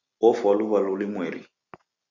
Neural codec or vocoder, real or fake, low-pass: none; real; 7.2 kHz